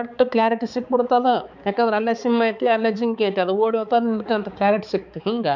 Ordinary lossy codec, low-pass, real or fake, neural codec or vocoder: none; 7.2 kHz; fake; codec, 16 kHz, 4 kbps, X-Codec, HuBERT features, trained on balanced general audio